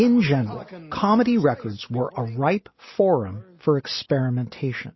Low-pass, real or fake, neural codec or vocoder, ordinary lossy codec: 7.2 kHz; real; none; MP3, 24 kbps